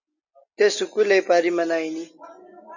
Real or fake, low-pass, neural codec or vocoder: real; 7.2 kHz; none